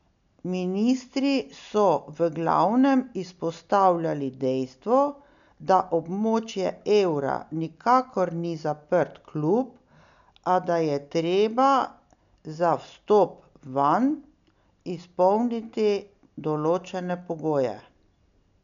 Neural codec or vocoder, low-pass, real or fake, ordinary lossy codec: none; 7.2 kHz; real; none